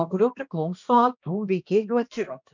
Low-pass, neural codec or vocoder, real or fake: 7.2 kHz; codec, 16 kHz, 1 kbps, X-Codec, HuBERT features, trained on balanced general audio; fake